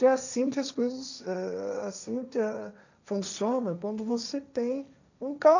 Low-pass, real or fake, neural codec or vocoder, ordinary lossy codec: 7.2 kHz; fake; codec, 16 kHz, 1.1 kbps, Voila-Tokenizer; none